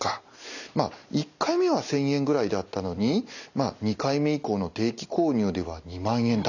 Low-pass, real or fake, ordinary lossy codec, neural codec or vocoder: 7.2 kHz; real; none; none